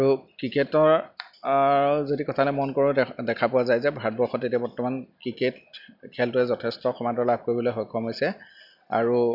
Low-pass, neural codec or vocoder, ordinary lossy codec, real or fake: 5.4 kHz; none; none; real